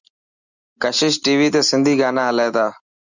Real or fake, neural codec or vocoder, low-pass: real; none; 7.2 kHz